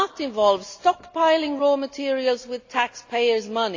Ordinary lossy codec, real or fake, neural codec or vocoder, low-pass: AAC, 48 kbps; real; none; 7.2 kHz